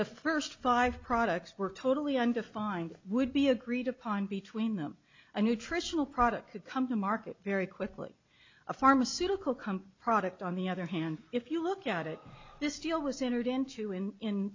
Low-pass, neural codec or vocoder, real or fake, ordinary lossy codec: 7.2 kHz; none; real; MP3, 64 kbps